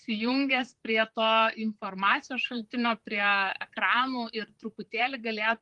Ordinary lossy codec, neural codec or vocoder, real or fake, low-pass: Opus, 24 kbps; vocoder, 44.1 kHz, 128 mel bands, Pupu-Vocoder; fake; 10.8 kHz